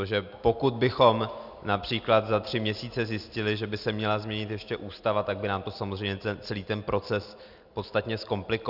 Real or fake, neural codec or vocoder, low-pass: real; none; 5.4 kHz